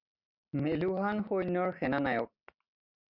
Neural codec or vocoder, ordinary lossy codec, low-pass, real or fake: none; Opus, 64 kbps; 5.4 kHz; real